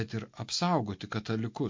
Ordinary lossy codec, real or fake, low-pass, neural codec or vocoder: MP3, 48 kbps; real; 7.2 kHz; none